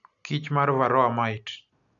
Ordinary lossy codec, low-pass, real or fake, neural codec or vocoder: none; 7.2 kHz; real; none